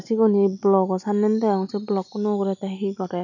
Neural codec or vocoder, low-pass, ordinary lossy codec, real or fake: none; 7.2 kHz; none; real